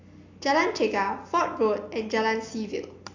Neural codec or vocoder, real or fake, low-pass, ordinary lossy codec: none; real; 7.2 kHz; AAC, 48 kbps